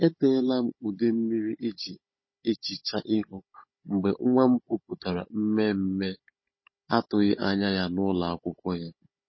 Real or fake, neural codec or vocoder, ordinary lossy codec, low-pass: fake; codec, 16 kHz, 16 kbps, FunCodec, trained on Chinese and English, 50 frames a second; MP3, 24 kbps; 7.2 kHz